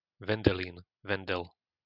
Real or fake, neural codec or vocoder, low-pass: real; none; 5.4 kHz